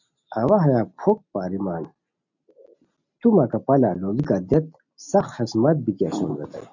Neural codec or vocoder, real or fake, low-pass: none; real; 7.2 kHz